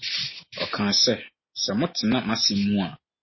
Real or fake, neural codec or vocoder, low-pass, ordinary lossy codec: real; none; 7.2 kHz; MP3, 24 kbps